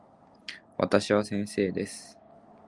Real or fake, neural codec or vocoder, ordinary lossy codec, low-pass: real; none; Opus, 32 kbps; 10.8 kHz